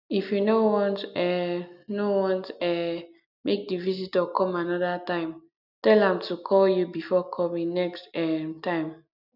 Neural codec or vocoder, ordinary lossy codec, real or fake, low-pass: none; none; real; 5.4 kHz